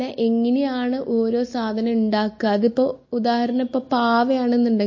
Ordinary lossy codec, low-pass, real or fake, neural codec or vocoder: MP3, 32 kbps; 7.2 kHz; real; none